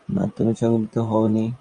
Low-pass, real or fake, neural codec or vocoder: 10.8 kHz; fake; vocoder, 24 kHz, 100 mel bands, Vocos